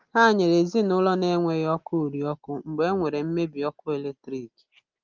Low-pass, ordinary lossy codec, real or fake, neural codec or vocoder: 7.2 kHz; Opus, 32 kbps; real; none